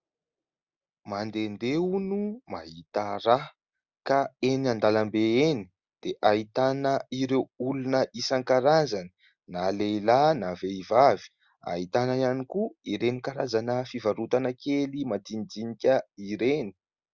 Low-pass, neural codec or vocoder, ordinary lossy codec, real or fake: 7.2 kHz; none; Opus, 64 kbps; real